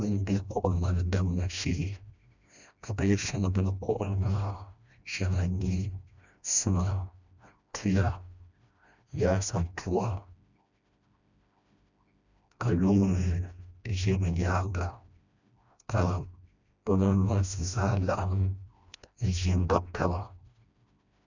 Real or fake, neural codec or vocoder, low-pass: fake; codec, 16 kHz, 1 kbps, FreqCodec, smaller model; 7.2 kHz